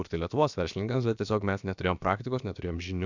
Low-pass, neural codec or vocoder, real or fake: 7.2 kHz; codec, 16 kHz, about 1 kbps, DyCAST, with the encoder's durations; fake